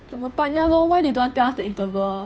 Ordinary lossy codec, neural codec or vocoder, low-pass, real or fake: none; codec, 16 kHz, 2 kbps, FunCodec, trained on Chinese and English, 25 frames a second; none; fake